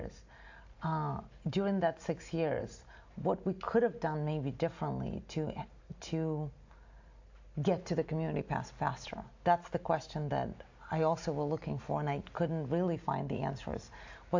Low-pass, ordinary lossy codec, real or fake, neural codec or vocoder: 7.2 kHz; AAC, 48 kbps; real; none